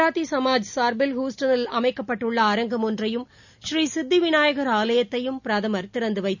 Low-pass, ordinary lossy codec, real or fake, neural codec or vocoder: 7.2 kHz; none; real; none